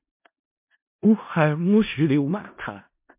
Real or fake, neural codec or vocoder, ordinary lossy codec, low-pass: fake; codec, 16 kHz in and 24 kHz out, 0.4 kbps, LongCat-Audio-Codec, four codebook decoder; MP3, 32 kbps; 3.6 kHz